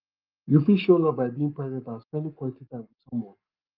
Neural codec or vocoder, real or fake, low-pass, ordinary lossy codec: codec, 44.1 kHz, 7.8 kbps, Pupu-Codec; fake; 5.4 kHz; Opus, 32 kbps